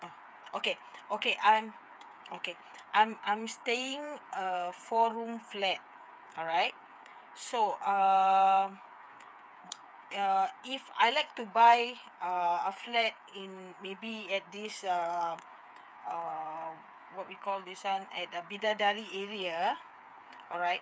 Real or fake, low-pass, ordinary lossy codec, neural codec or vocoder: fake; none; none; codec, 16 kHz, 8 kbps, FreqCodec, smaller model